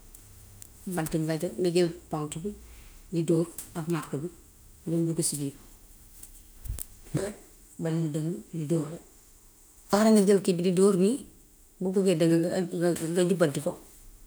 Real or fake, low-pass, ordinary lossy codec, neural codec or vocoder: fake; none; none; autoencoder, 48 kHz, 32 numbers a frame, DAC-VAE, trained on Japanese speech